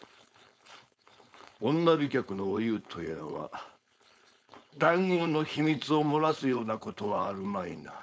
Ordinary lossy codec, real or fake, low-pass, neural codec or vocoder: none; fake; none; codec, 16 kHz, 4.8 kbps, FACodec